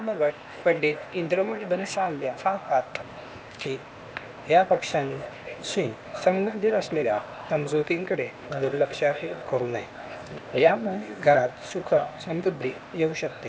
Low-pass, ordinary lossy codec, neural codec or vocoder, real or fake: none; none; codec, 16 kHz, 0.8 kbps, ZipCodec; fake